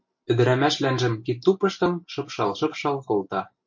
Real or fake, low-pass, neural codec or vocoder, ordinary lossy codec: real; 7.2 kHz; none; MP3, 48 kbps